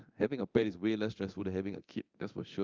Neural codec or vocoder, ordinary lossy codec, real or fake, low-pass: codec, 24 kHz, 0.9 kbps, DualCodec; Opus, 24 kbps; fake; 7.2 kHz